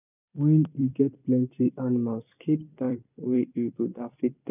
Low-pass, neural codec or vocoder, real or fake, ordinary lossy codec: 3.6 kHz; vocoder, 44.1 kHz, 128 mel bands every 256 samples, BigVGAN v2; fake; none